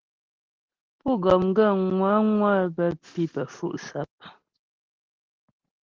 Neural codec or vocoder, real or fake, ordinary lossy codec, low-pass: none; real; Opus, 16 kbps; 7.2 kHz